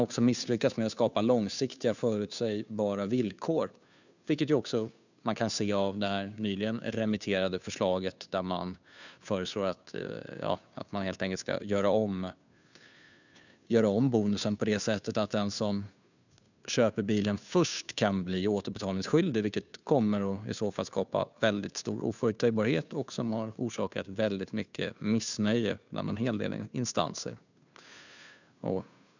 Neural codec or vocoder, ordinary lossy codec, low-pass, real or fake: codec, 16 kHz, 2 kbps, FunCodec, trained on Chinese and English, 25 frames a second; none; 7.2 kHz; fake